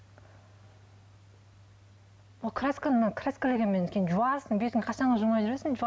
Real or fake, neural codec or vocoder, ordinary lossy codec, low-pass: real; none; none; none